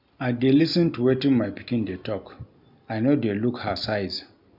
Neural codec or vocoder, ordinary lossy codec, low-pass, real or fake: none; none; 5.4 kHz; real